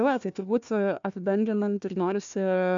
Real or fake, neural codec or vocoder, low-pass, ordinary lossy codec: fake; codec, 16 kHz, 1 kbps, FunCodec, trained on Chinese and English, 50 frames a second; 7.2 kHz; MP3, 64 kbps